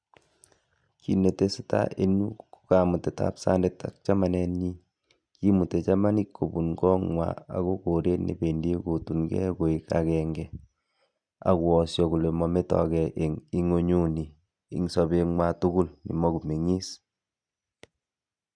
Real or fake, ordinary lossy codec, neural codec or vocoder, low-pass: real; none; none; 9.9 kHz